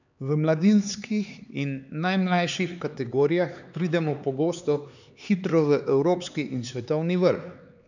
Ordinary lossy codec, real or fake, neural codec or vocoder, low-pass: none; fake; codec, 16 kHz, 4 kbps, X-Codec, HuBERT features, trained on LibriSpeech; 7.2 kHz